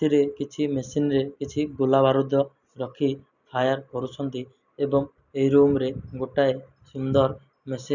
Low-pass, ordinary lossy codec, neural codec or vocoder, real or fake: 7.2 kHz; none; none; real